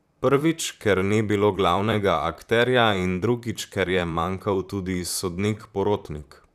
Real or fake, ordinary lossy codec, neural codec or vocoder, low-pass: fake; none; vocoder, 44.1 kHz, 128 mel bands, Pupu-Vocoder; 14.4 kHz